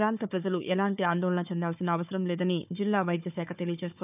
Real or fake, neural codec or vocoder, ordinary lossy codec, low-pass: fake; codec, 16 kHz, 4 kbps, FunCodec, trained on Chinese and English, 50 frames a second; none; 3.6 kHz